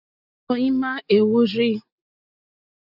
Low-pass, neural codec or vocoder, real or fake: 5.4 kHz; none; real